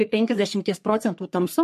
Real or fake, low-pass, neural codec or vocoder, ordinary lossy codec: fake; 14.4 kHz; codec, 44.1 kHz, 3.4 kbps, Pupu-Codec; MP3, 64 kbps